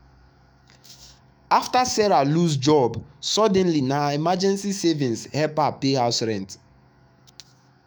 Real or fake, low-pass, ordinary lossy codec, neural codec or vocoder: fake; none; none; autoencoder, 48 kHz, 128 numbers a frame, DAC-VAE, trained on Japanese speech